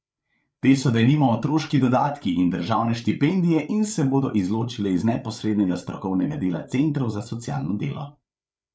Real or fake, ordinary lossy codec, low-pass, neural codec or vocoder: fake; none; none; codec, 16 kHz, 8 kbps, FreqCodec, larger model